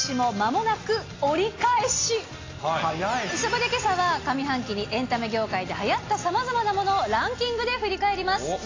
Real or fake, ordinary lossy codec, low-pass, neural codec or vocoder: real; AAC, 32 kbps; 7.2 kHz; none